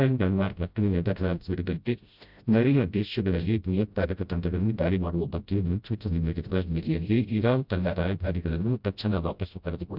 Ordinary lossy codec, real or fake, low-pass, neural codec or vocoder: none; fake; 5.4 kHz; codec, 16 kHz, 0.5 kbps, FreqCodec, smaller model